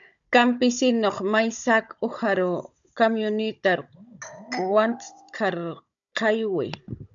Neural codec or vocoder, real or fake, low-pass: codec, 16 kHz, 16 kbps, FunCodec, trained on Chinese and English, 50 frames a second; fake; 7.2 kHz